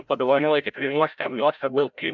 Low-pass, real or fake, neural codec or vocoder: 7.2 kHz; fake; codec, 16 kHz, 0.5 kbps, FreqCodec, larger model